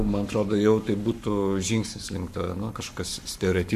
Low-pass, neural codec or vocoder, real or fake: 14.4 kHz; codec, 44.1 kHz, 7.8 kbps, Pupu-Codec; fake